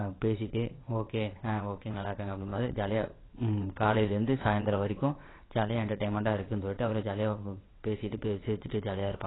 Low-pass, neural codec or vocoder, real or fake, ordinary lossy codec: 7.2 kHz; vocoder, 22.05 kHz, 80 mel bands, WaveNeXt; fake; AAC, 16 kbps